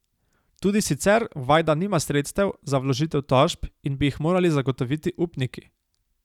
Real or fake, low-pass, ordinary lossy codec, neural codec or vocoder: real; 19.8 kHz; none; none